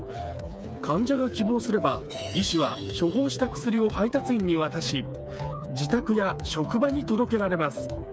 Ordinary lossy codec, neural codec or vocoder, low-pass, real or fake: none; codec, 16 kHz, 4 kbps, FreqCodec, smaller model; none; fake